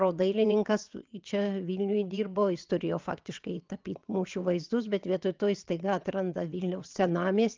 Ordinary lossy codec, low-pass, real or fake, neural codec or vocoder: Opus, 24 kbps; 7.2 kHz; fake; vocoder, 22.05 kHz, 80 mel bands, WaveNeXt